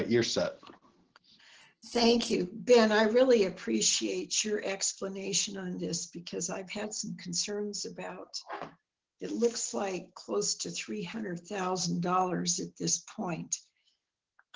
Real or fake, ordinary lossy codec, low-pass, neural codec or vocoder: real; Opus, 16 kbps; 7.2 kHz; none